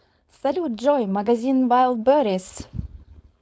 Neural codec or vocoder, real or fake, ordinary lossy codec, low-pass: codec, 16 kHz, 4.8 kbps, FACodec; fake; none; none